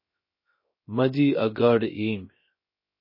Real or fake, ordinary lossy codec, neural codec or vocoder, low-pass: fake; MP3, 24 kbps; codec, 16 kHz, 0.3 kbps, FocalCodec; 5.4 kHz